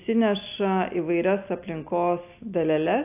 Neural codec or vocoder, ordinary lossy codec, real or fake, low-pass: none; AAC, 32 kbps; real; 3.6 kHz